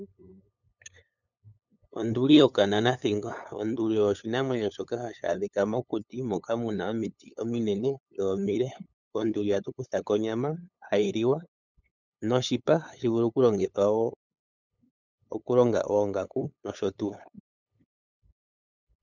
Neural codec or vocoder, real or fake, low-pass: codec, 16 kHz, 8 kbps, FunCodec, trained on LibriTTS, 25 frames a second; fake; 7.2 kHz